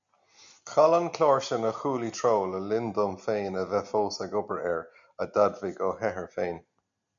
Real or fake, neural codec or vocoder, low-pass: real; none; 7.2 kHz